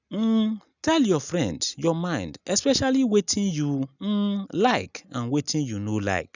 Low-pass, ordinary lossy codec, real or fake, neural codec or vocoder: 7.2 kHz; none; real; none